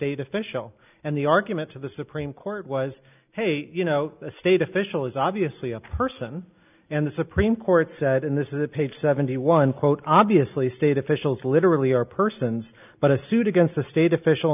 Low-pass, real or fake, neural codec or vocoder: 3.6 kHz; real; none